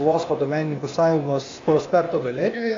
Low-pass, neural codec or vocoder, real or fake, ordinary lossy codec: 7.2 kHz; codec, 16 kHz, 0.8 kbps, ZipCodec; fake; AAC, 48 kbps